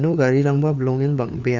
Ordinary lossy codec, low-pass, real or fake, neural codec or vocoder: none; 7.2 kHz; fake; codec, 16 kHz, 4 kbps, FunCodec, trained on LibriTTS, 50 frames a second